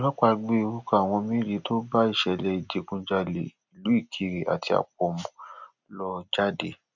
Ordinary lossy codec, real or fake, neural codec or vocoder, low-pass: none; real; none; 7.2 kHz